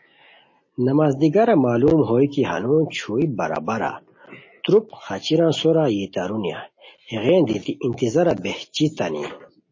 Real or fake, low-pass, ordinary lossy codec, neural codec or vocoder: real; 7.2 kHz; MP3, 32 kbps; none